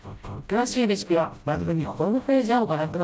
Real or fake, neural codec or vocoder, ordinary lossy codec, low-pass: fake; codec, 16 kHz, 0.5 kbps, FreqCodec, smaller model; none; none